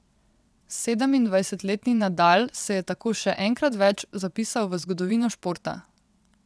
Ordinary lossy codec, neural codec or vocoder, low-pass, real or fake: none; vocoder, 22.05 kHz, 80 mel bands, WaveNeXt; none; fake